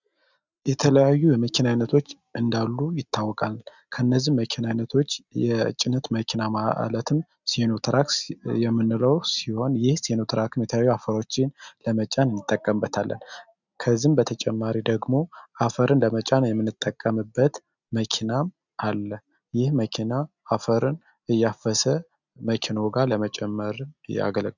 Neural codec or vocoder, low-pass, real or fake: none; 7.2 kHz; real